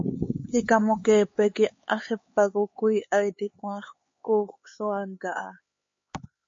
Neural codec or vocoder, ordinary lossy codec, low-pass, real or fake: codec, 16 kHz, 4 kbps, X-Codec, HuBERT features, trained on LibriSpeech; MP3, 32 kbps; 7.2 kHz; fake